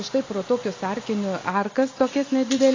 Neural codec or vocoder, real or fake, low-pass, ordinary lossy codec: none; real; 7.2 kHz; AAC, 32 kbps